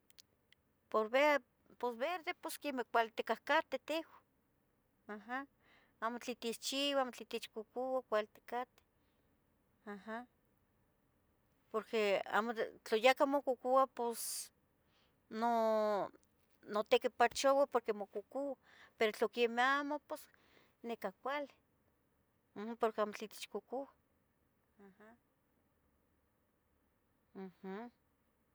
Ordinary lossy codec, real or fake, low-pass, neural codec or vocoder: none; real; none; none